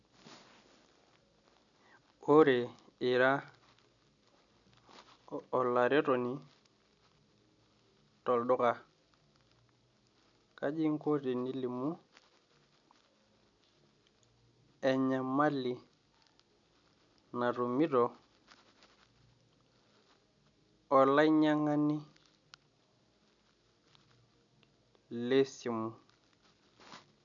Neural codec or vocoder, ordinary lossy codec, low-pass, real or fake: none; none; 7.2 kHz; real